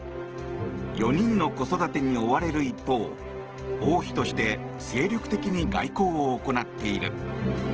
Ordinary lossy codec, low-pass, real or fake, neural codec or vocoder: Opus, 16 kbps; 7.2 kHz; real; none